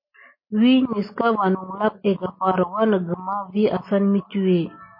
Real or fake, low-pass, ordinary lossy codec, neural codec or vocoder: real; 5.4 kHz; MP3, 24 kbps; none